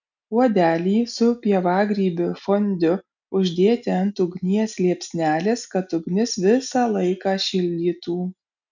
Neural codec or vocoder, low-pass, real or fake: none; 7.2 kHz; real